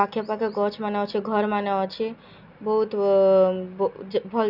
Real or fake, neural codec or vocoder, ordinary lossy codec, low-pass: real; none; none; 5.4 kHz